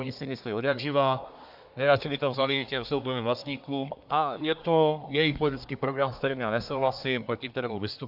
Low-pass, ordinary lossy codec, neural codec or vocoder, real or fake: 5.4 kHz; AAC, 48 kbps; codec, 24 kHz, 1 kbps, SNAC; fake